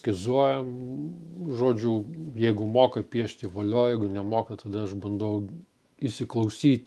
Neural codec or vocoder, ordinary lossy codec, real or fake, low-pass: autoencoder, 48 kHz, 128 numbers a frame, DAC-VAE, trained on Japanese speech; Opus, 32 kbps; fake; 14.4 kHz